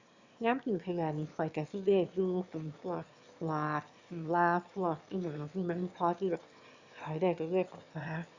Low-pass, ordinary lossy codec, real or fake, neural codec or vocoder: 7.2 kHz; Opus, 64 kbps; fake; autoencoder, 22.05 kHz, a latent of 192 numbers a frame, VITS, trained on one speaker